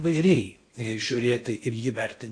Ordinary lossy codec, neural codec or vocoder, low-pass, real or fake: AAC, 48 kbps; codec, 16 kHz in and 24 kHz out, 0.6 kbps, FocalCodec, streaming, 4096 codes; 9.9 kHz; fake